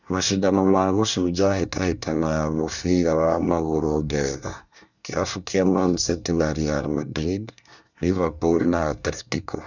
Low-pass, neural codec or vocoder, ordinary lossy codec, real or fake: 7.2 kHz; codec, 24 kHz, 1 kbps, SNAC; none; fake